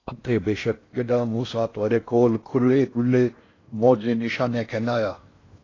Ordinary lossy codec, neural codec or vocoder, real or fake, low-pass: AAC, 32 kbps; codec, 16 kHz in and 24 kHz out, 0.6 kbps, FocalCodec, streaming, 4096 codes; fake; 7.2 kHz